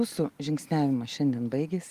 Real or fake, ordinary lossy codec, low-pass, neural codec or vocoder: real; Opus, 24 kbps; 14.4 kHz; none